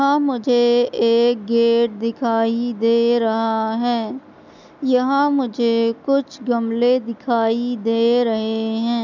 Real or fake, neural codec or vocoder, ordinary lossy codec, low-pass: real; none; none; 7.2 kHz